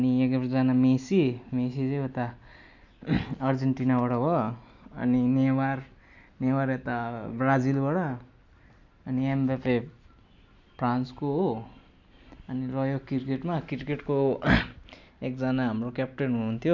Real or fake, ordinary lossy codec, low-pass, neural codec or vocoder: real; none; 7.2 kHz; none